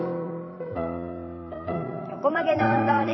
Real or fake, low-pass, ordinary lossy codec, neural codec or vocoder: fake; 7.2 kHz; MP3, 24 kbps; vocoder, 22.05 kHz, 80 mel bands, Vocos